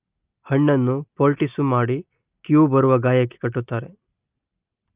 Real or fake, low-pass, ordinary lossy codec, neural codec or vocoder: real; 3.6 kHz; Opus, 24 kbps; none